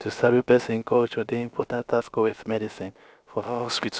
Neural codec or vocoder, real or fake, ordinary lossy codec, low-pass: codec, 16 kHz, about 1 kbps, DyCAST, with the encoder's durations; fake; none; none